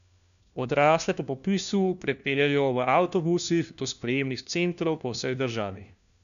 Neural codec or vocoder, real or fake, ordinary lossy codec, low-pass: codec, 16 kHz, 1 kbps, FunCodec, trained on LibriTTS, 50 frames a second; fake; none; 7.2 kHz